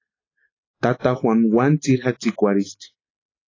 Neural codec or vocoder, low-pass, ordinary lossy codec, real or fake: none; 7.2 kHz; AAC, 32 kbps; real